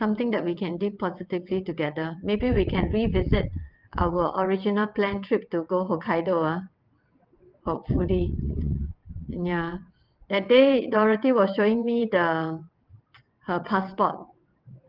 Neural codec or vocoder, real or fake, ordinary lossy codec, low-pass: vocoder, 22.05 kHz, 80 mel bands, WaveNeXt; fake; Opus, 32 kbps; 5.4 kHz